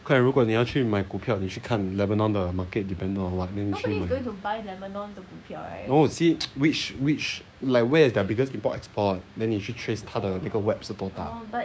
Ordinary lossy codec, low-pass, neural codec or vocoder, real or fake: none; none; codec, 16 kHz, 6 kbps, DAC; fake